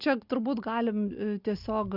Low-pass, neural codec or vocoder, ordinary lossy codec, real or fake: 5.4 kHz; none; Opus, 64 kbps; real